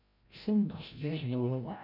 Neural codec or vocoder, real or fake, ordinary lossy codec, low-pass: codec, 16 kHz, 0.5 kbps, FreqCodec, larger model; fake; none; 5.4 kHz